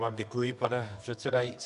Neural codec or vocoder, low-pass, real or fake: codec, 24 kHz, 0.9 kbps, WavTokenizer, medium music audio release; 10.8 kHz; fake